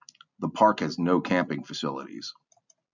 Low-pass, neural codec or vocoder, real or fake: 7.2 kHz; none; real